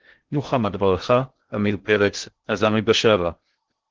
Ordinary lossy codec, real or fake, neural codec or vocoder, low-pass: Opus, 16 kbps; fake; codec, 16 kHz in and 24 kHz out, 0.6 kbps, FocalCodec, streaming, 2048 codes; 7.2 kHz